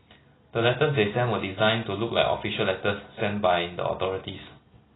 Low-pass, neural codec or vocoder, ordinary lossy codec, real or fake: 7.2 kHz; none; AAC, 16 kbps; real